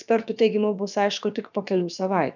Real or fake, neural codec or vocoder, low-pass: fake; codec, 16 kHz, about 1 kbps, DyCAST, with the encoder's durations; 7.2 kHz